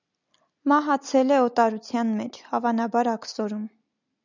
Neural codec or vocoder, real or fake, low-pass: none; real; 7.2 kHz